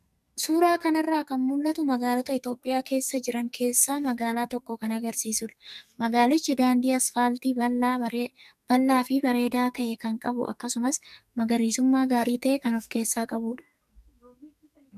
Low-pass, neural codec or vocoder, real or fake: 14.4 kHz; codec, 44.1 kHz, 2.6 kbps, SNAC; fake